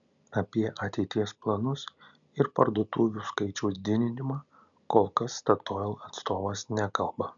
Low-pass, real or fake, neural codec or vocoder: 7.2 kHz; real; none